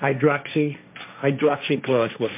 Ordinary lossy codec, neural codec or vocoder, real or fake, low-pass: MP3, 32 kbps; codec, 16 kHz, 1.1 kbps, Voila-Tokenizer; fake; 3.6 kHz